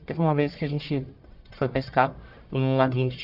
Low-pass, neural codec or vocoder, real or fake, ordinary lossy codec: 5.4 kHz; codec, 44.1 kHz, 1.7 kbps, Pupu-Codec; fake; none